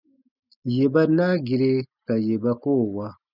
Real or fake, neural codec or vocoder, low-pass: real; none; 5.4 kHz